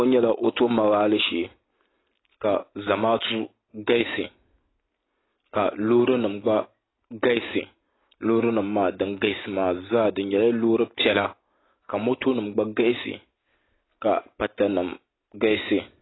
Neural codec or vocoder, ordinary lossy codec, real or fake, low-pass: none; AAC, 16 kbps; real; 7.2 kHz